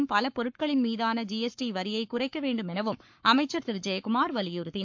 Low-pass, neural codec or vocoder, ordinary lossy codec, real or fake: 7.2 kHz; codec, 44.1 kHz, 7.8 kbps, Pupu-Codec; MP3, 48 kbps; fake